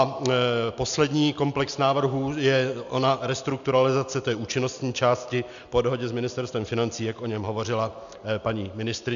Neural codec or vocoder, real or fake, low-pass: none; real; 7.2 kHz